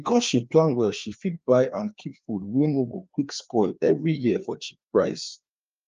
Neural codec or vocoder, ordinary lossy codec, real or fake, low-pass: codec, 16 kHz, 2 kbps, FreqCodec, larger model; Opus, 32 kbps; fake; 7.2 kHz